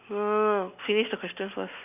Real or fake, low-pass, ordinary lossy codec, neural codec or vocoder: real; 3.6 kHz; none; none